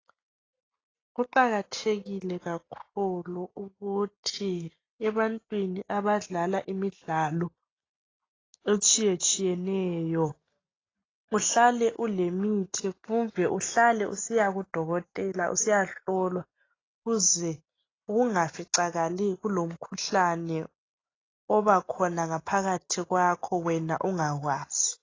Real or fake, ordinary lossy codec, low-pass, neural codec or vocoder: real; AAC, 32 kbps; 7.2 kHz; none